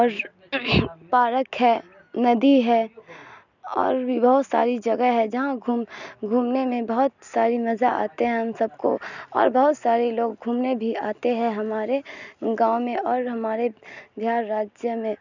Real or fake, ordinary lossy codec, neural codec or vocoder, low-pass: real; none; none; 7.2 kHz